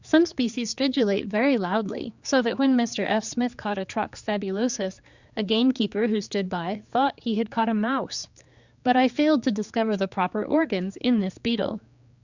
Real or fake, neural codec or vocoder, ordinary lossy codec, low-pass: fake; codec, 16 kHz, 4 kbps, X-Codec, HuBERT features, trained on general audio; Opus, 64 kbps; 7.2 kHz